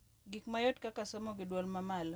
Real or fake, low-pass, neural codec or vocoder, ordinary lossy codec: real; none; none; none